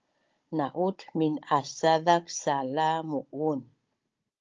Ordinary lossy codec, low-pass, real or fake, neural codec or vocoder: Opus, 24 kbps; 7.2 kHz; fake; codec, 16 kHz, 16 kbps, FunCodec, trained on Chinese and English, 50 frames a second